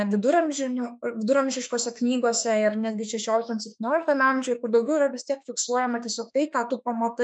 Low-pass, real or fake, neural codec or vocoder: 9.9 kHz; fake; autoencoder, 48 kHz, 32 numbers a frame, DAC-VAE, trained on Japanese speech